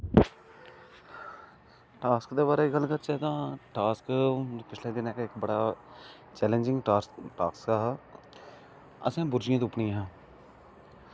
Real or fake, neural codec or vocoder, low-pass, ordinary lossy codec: real; none; none; none